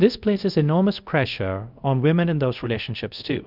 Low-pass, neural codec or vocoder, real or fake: 5.4 kHz; codec, 24 kHz, 0.5 kbps, DualCodec; fake